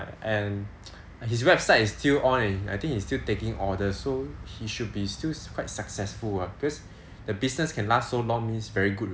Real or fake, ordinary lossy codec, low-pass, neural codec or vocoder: real; none; none; none